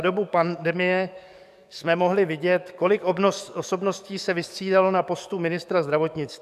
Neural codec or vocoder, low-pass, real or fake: codec, 44.1 kHz, 7.8 kbps, DAC; 14.4 kHz; fake